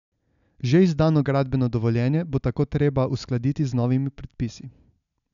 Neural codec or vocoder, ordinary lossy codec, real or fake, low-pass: none; MP3, 96 kbps; real; 7.2 kHz